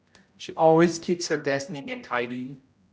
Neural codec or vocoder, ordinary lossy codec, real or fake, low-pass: codec, 16 kHz, 0.5 kbps, X-Codec, HuBERT features, trained on general audio; none; fake; none